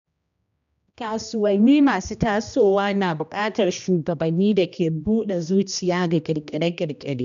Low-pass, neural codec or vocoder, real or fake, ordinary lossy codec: 7.2 kHz; codec, 16 kHz, 1 kbps, X-Codec, HuBERT features, trained on general audio; fake; none